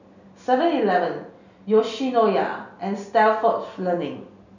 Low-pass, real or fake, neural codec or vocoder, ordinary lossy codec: 7.2 kHz; real; none; none